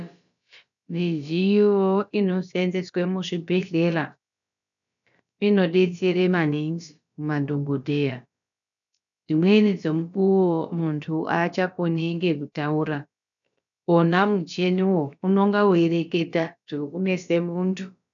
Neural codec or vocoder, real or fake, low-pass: codec, 16 kHz, about 1 kbps, DyCAST, with the encoder's durations; fake; 7.2 kHz